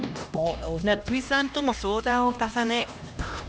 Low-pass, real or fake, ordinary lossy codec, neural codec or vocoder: none; fake; none; codec, 16 kHz, 1 kbps, X-Codec, HuBERT features, trained on LibriSpeech